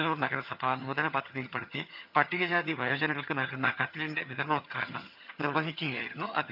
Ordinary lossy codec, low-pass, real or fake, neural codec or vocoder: none; 5.4 kHz; fake; vocoder, 22.05 kHz, 80 mel bands, HiFi-GAN